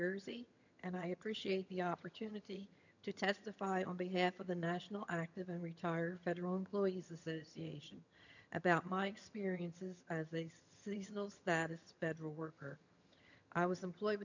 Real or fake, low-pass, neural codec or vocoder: fake; 7.2 kHz; vocoder, 22.05 kHz, 80 mel bands, HiFi-GAN